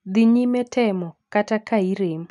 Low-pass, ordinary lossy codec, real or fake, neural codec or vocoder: 10.8 kHz; none; real; none